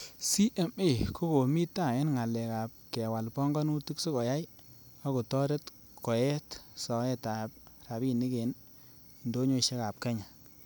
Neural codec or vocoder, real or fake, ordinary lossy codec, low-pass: none; real; none; none